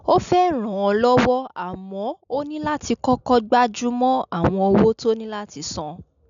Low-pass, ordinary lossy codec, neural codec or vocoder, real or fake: 7.2 kHz; none; none; real